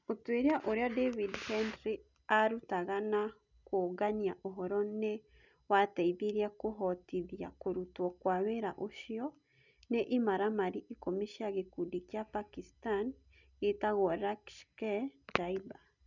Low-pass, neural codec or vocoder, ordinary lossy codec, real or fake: 7.2 kHz; none; none; real